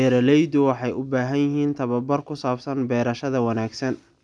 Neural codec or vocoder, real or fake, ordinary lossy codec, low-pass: none; real; none; 9.9 kHz